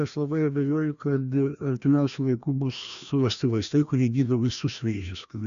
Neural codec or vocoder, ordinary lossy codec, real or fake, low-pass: codec, 16 kHz, 1 kbps, FreqCodec, larger model; AAC, 96 kbps; fake; 7.2 kHz